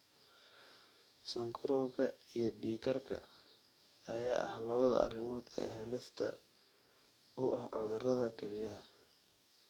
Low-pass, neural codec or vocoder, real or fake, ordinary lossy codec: 19.8 kHz; codec, 44.1 kHz, 2.6 kbps, DAC; fake; none